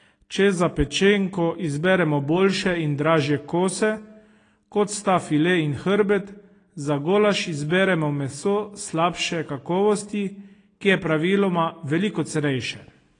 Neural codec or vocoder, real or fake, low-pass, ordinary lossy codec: none; real; 9.9 kHz; AAC, 32 kbps